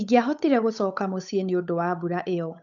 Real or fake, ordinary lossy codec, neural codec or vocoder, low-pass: fake; none; codec, 16 kHz, 8 kbps, FunCodec, trained on Chinese and English, 25 frames a second; 7.2 kHz